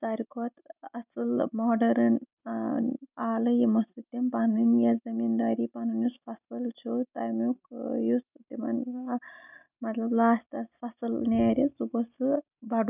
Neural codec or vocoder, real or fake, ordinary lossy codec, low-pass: none; real; none; 3.6 kHz